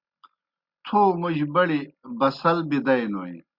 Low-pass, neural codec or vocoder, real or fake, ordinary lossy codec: 5.4 kHz; none; real; Opus, 64 kbps